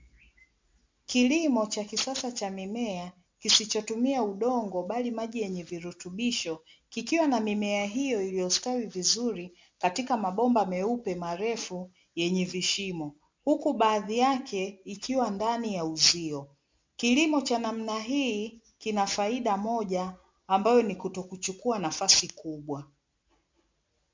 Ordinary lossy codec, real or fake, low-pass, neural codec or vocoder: MP3, 64 kbps; real; 7.2 kHz; none